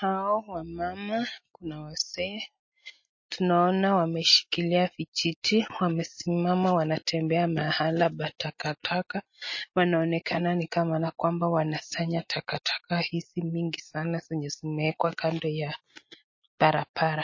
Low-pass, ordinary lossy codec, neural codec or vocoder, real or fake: 7.2 kHz; MP3, 32 kbps; none; real